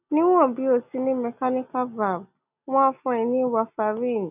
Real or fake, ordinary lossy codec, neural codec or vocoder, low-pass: real; none; none; 3.6 kHz